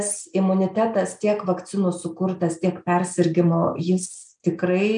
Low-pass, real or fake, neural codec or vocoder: 9.9 kHz; real; none